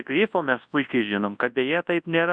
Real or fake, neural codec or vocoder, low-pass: fake; codec, 24 kHz, 0.9 kbps, WavTokenizer, large speech release; 9.9 kHz